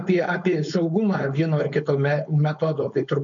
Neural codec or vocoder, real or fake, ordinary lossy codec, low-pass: codec, 16 kHz, 4.8 kbps, FACodec; fake; MP3, 96 kbps; 7.2 kHz